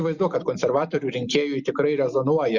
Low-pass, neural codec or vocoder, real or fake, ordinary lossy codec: 7.2 kHz; vocoder, 44.1 kHz, 128 mel bands every 256 samples, BigVGAN v2; fake; Opus, 64 kbps